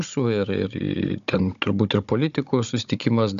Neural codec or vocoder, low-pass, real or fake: codec, 16 kHz, 16 kbps, FunCodec, trained on Chinese and English, 50 frames a second; 7.2 kHz; fake